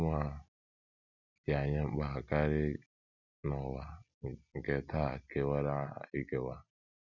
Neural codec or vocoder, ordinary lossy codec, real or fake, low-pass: none; none; real; 7.2 kHz